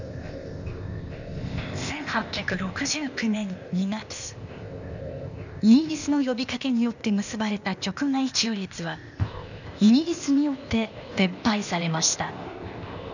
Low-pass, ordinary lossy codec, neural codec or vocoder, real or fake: 7.2 kHz; none; codec, 16 kHz, 0.8 kbps, ZipCodec; fake